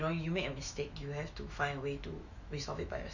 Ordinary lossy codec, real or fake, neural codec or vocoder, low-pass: none; fake; autoencoder, 48 kHz, 128 numbers a frame, DAC-VAE, trained on Japanese speech; 7.2 kHz